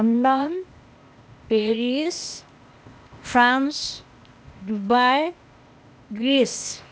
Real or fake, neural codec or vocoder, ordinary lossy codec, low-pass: fake; codec, 16 kHz, 0.8 kbps, ZipCodec; none; none